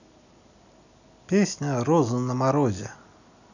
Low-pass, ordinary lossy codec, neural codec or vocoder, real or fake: 7.2 kHz; none; none; real